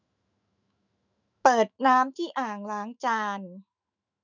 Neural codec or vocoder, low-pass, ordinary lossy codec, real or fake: autoencoder, 48 kHz, 128 numbers a frame, DAC-VAE, trained on Japanese speech; 7.2 kHz; none; fake